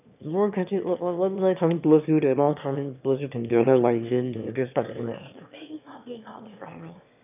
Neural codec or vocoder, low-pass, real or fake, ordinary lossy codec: autoencoder, 22.05 kHz, a latent of 192 numbers a frame, VITS, trained on one speaker; 3.6 kHz; fake; none